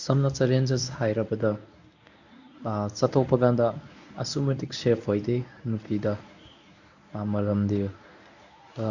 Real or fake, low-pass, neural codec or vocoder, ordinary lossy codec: fake; 7.2 kHz; codec, 24 kHz, 0.9 kbps, WavTokenizer, medium speech release version 1; none